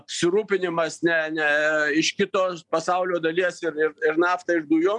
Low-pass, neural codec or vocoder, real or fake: 10.8 kHz; none; real